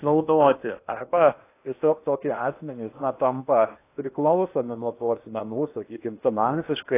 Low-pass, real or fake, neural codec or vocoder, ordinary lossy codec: 3.6 kHz; fake; codec, 16 kHz in and 24 kHz out, 0.8 kbps, FocalCodec, streaming, 65536 codes; AAC, 24 kbps